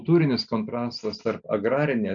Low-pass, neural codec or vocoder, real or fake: 7.2 kHz; none; real